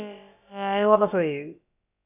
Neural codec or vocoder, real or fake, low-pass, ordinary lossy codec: codec, 16 kHz, about 1 kbps, DyCAST, with the encoder's durations; fake; 3.6 kHz; none